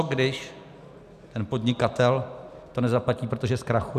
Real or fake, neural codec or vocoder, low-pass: real; none; 14.4 kHz